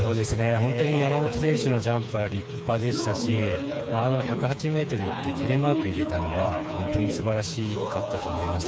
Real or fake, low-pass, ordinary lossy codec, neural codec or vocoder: fake; none; none; codec, 16 kHz, 4 kbps, FreqCodec, smaller model